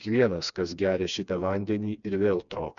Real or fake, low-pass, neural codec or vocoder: fake; 7.2 kHz; codec, 16 kHz, 2 kbps, FreqCodec, smaller model